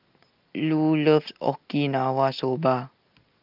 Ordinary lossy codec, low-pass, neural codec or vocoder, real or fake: Opus, 24 kbps; 5.4 kHz; none; real